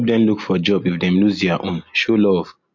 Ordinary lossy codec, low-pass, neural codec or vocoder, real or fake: MP3, 48 kbps; 7.2 kHz; none; real